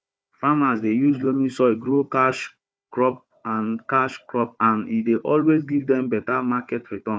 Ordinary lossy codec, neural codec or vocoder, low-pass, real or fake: none; codec, 16 kHz, 4 kbps, FunCodec, trained on Chinese and English, 50 frames a second; none; fake